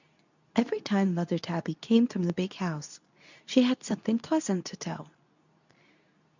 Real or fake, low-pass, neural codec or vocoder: fake; 7.2 kHz; codec, 24 kHz, 0.9 kbps, WavTokenizer, medium speech release version 2